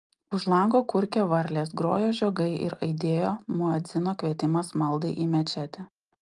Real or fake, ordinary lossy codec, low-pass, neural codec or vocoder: real; Opus, 32 kbps; 10.8 kHz; none